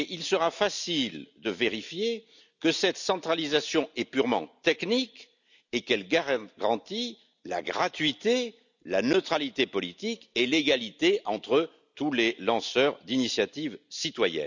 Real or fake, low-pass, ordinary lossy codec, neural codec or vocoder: real; 7.2 kHz; none; none